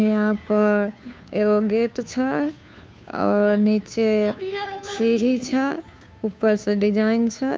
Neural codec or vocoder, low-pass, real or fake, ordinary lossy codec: codec, 16 kHz, 2 kbps, FunCodec, trained on Chinese and English, 25 frames a second; none; fake; none